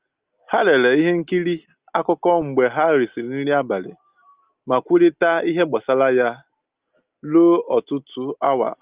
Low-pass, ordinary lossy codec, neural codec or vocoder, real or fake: 3.6 kHz; Opus, 24 kbps; none; real